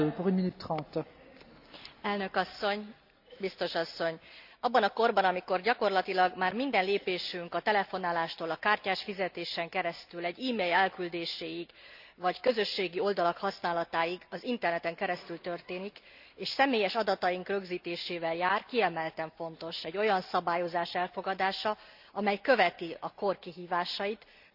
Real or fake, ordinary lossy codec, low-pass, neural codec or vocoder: real; none; 5.4 kHz; none